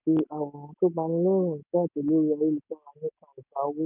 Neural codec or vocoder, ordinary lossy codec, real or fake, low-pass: none; none; real; 3.6 kHz